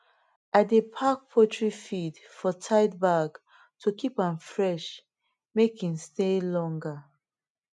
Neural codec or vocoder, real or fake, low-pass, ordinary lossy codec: none; real; 10.8 kHz; none